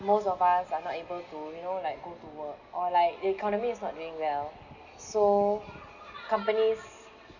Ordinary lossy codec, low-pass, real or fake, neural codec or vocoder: none; 7.2 kHz; real; none